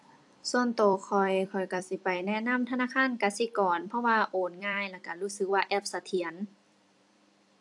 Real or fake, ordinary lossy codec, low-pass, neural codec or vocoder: fake; AAC, 64 kbps; 10.8 kHz; vocoder, 44.1 kHz, 128 mel bands every 256 samples, BigVGAN v2